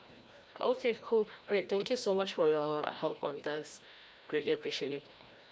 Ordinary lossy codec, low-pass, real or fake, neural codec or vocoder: none; none; fake; codec, 16 kHz, 1 kbps, FreqCodec, larger model